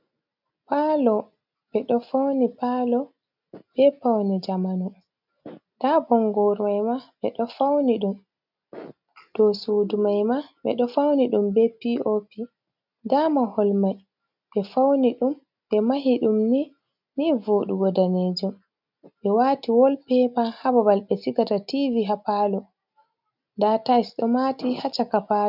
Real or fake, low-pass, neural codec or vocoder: real; 5.4 kHz; none